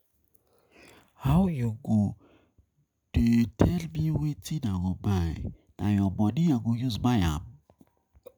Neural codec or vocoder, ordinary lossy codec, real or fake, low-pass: none; none; real; none